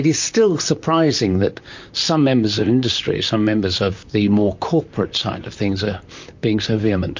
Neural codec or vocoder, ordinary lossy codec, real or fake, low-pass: vocoder, 44.1 kHz, 128 mel bands, Pupu-Vocoder; MP3, 48 kbps; fake; 7.2 kHz